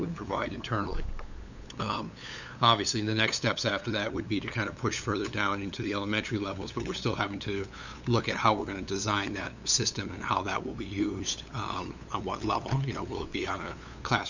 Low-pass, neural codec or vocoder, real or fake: 7.2 kHz; codec, 16 kHz, 8 kbps, FunCodec, trained on LibriTTS, 25 frames a second; fake